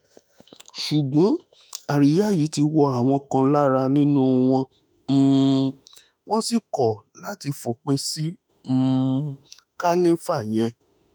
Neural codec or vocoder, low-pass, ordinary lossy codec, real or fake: autoencoder, 48 kHz, 32 numbers a frame, DAC-VAE, trained on Japanese speech; none; none; fake